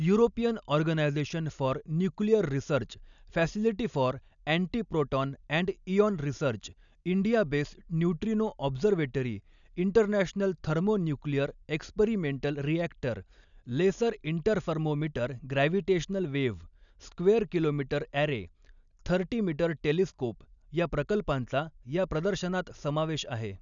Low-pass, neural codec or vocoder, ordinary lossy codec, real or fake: 7.2 kHz; none; none; real